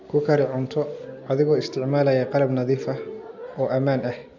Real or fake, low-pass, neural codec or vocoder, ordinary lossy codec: real; 7.2 kHz; none; none